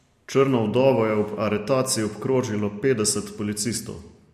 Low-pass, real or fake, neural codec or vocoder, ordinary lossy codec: 14.4 kHz; real; none; MP3, 64 kbps